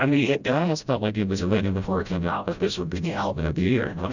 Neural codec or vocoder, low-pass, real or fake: codec, 16 kHz, 0.5 kbps, FreqCodec, smaller model; 7.2 kHz; fake